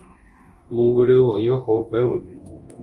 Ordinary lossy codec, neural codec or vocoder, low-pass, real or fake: Opus, 32 kbps; codec, 24 kHz, 0.9 kbps, DualCodec; 10.8 kHz; fake